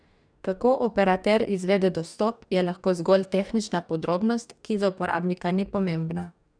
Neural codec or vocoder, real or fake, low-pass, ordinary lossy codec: codec, 44.1 kHz, 2.6 kbps, DAC; fake; 9.9 kHz; none